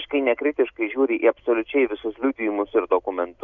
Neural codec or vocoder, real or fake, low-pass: none; real; 7.2 kHz